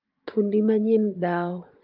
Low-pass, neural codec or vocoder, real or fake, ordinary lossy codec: 5.4 kHz; vocoder, 44.1 kHz, 80 mel bands, Vocos; fake; Opus, 24 kbps